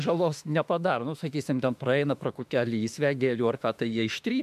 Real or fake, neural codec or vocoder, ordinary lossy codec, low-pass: fake; autoencoder, 48 kHz, 32 numbers a frame, DAC-VAE, trained on Japanese speech; MP3, 96 kbps; 14.4 kHz